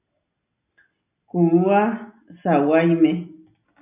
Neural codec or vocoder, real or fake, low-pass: none; real; 3.6 kHz